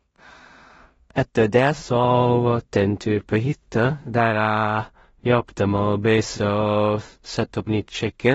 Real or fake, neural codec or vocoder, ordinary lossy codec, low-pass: fake; codec, 16 kHz in and 24 kHz out, 0.4 kbps, LongCat-Audio-Codec, two codebook decoder; AAC, 24 kbps; 10.8 kHz